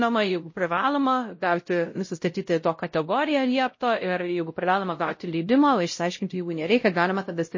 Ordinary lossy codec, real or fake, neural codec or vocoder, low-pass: MP3, 32 kbps; fake; codec, 16 kHz, 0.5 kbps, X-Codec, WavLM features, trained on Multilingual LibriSpeech; 7.2 kHz